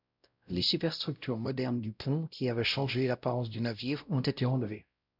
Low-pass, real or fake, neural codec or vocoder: 5.4 kHz; fake; codec, 16 kHz, 0.5 kbps, X-Codec, WavLM features, trained on Multilingual LibriSpeech